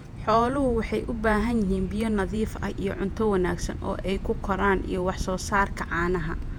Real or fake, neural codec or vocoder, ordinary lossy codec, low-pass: fake; vocoder, 48 kHz, 128 mel bands, Vocos; none; 19.8 kHz